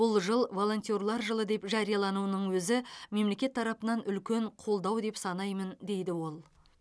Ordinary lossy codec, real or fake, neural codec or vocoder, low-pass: none; real; none; none